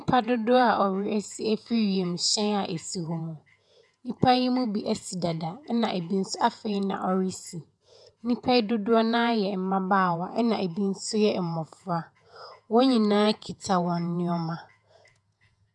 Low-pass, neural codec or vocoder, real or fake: 10.8 kHz; vocoder, 48 kHz, 128 mel bands, Vocos; fake